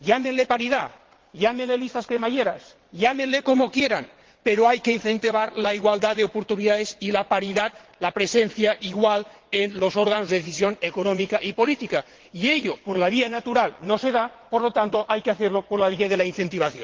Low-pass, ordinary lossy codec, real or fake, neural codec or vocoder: 7.2 kHz; Opus, 16 kbps; fake; vocoder, 22.05 kHz, 80 mel bands, WaveNeXt